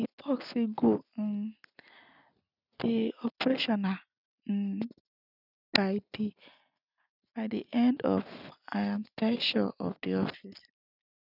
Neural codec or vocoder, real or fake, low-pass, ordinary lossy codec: none; real; 5.4 kHz; none